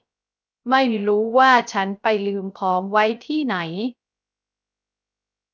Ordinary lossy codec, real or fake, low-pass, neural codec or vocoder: none; fake; none; codec, 16 kHz, 0.3 kbps, FocalCodec